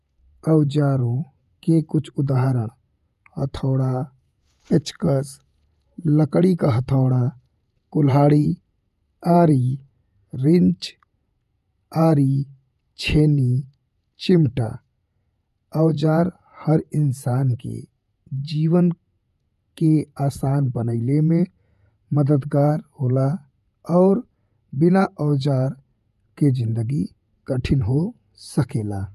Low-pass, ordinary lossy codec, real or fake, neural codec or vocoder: 14.4 kHz; none; fake; vocoder, 48 kHz, 128 mel bands, Vocos